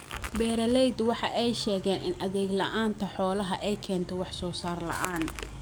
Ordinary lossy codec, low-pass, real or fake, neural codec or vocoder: none; none; fake; codec, 44.1 kHz, 7.8 kbps, DAC